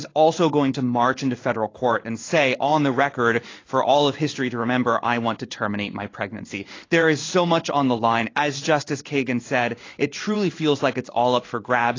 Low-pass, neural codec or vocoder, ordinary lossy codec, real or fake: 7.2 kHz; codec, 16 kHz in and 24 kHz out, 1 kbps, XY-Tokenizer; AAC, 32 kbps; fake